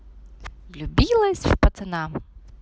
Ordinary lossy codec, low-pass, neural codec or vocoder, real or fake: none; none; none; real